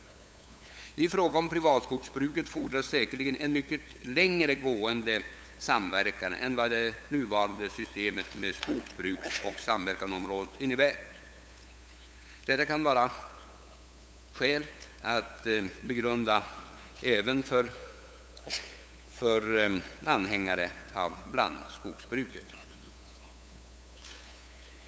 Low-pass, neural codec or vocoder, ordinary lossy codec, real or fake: none; codec, 16 kHz, 8 kbps, FunCodec, trained on LibriTTS, 25 frames a second; none; fake